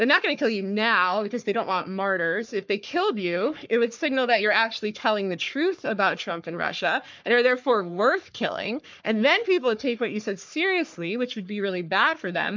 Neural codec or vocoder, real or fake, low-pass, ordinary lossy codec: codec, 44.1 kHz, 3.4 kbps, Pupu-Codec; fake; 7.2 kHz; MP3, 64 kbps